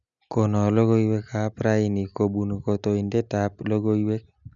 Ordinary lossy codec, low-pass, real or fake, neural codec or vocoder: MP3, 96 kbps; 7.2 kHz; real; none